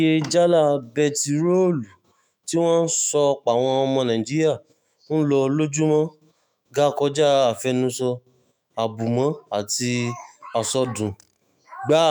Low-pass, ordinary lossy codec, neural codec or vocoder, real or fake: none; none; autoencoder, 48 kHz, 128 numbers a frame, DAC-VAE, trained on Japanese speech; fake